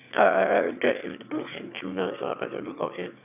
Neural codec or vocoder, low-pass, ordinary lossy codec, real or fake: autoencoder, 22.05 kHz, a latent of 192 numbers a frame, VITS, trained on one speaker; 3.6 kHz; none; fake